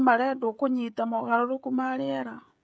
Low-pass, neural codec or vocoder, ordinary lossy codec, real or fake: none; codec, 16 kHz, 16 kbps, FreqCodec, smaller model; none; fake